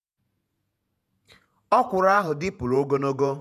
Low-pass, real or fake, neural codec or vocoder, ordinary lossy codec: 14.4 kHz; fake; vocoder, 48 kHz, 128 mel bands, Vocos; none